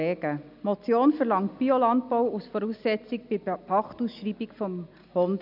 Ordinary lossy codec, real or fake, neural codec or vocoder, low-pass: none; real; none; 5.4 kHz